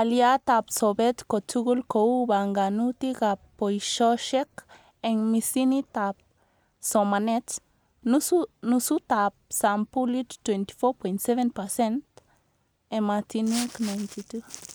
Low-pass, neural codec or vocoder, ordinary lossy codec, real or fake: none; none; none; real